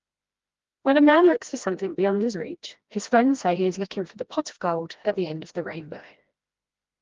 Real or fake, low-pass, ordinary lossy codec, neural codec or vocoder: fake; 7.2 kHz; Opus, 32 kbps; codec, 16 kHz, 1 kbps, FreqCodec, smaller model